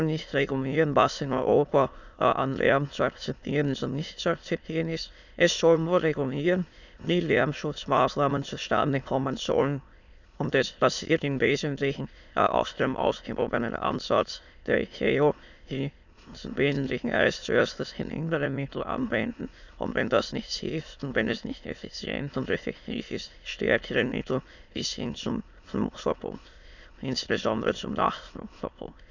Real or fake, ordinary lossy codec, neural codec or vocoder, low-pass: fake; none; autoencoder, 22.05 kHz, a latent of 192 numbers a frame, VITS, trained on many speakers; 7.2 kHz